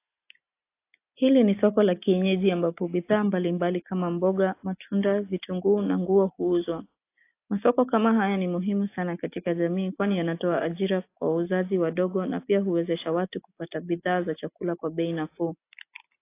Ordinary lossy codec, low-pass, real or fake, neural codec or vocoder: AAC, 24 kbps; 3.6 kHz; real; none